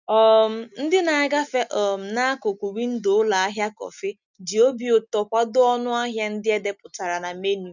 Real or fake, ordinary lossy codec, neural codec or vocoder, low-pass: real; none; none; 7.2 kHz